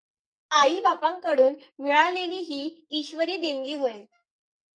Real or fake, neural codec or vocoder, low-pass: fake; codec, 44.1 kHz, 2.6 kbps, SNAC; 9.9 kHz